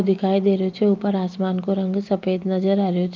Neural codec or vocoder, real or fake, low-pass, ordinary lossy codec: none; real; 7.2 kHz; Opus, 24 kbps